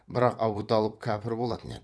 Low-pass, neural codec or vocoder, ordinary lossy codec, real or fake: none; vocoder, 22.05 kHz, 80 mel bands, WaveNeXt; none; fake